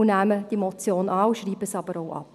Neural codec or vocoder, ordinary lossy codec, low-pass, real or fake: none; none; 14.4 kHz; real